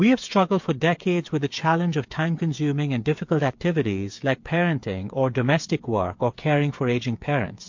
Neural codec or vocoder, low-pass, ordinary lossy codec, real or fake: codec, 16 kHz, 8 kbps, FreqCodec, smaller model; 7.2 kHz; MP3, 48 kbps; fake